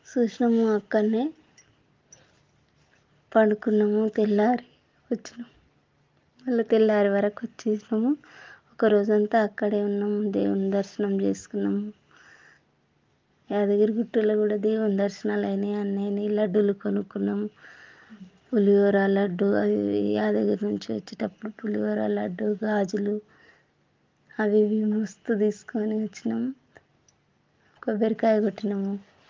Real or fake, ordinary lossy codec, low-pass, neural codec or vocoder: real; Opus, 32 kbps; 7.2 kHz; none